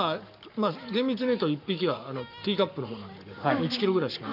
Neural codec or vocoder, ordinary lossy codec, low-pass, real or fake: codec, 44.1 kHz, 7.8 kbps, Pupu-Codec; none; 5.4 kHz; fake